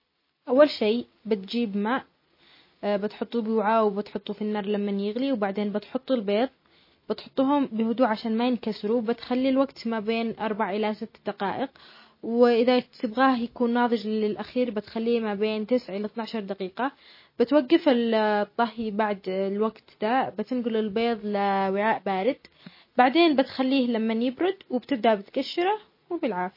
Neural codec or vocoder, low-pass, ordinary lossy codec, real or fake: none; 5.4 kHz; MP3, 24 kbps; real